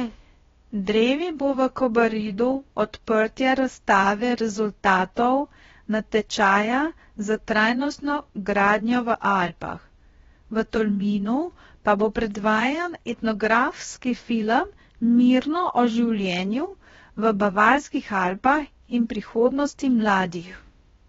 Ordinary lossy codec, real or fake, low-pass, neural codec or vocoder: AAC, 24 kbps; fake; 7.2 kHz; codec, 16 kHz, about 1 kbps, DyCAST, with the encoder's durations